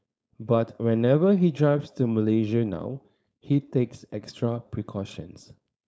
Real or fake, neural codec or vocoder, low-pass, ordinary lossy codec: fake; codec, 16 kHz, 4.8 kbps, FACodec; none; none